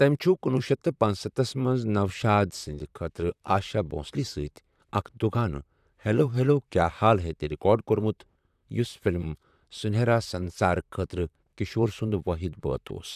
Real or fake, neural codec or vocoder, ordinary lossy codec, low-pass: fake; vocoder, 44.1 kHz, 128 mel bands, Pupu-Vocoder; none; 14.4 kHz